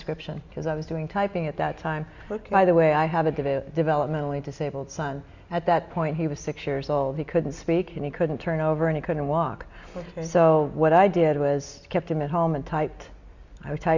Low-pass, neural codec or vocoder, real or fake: 7.2 kHz; none; real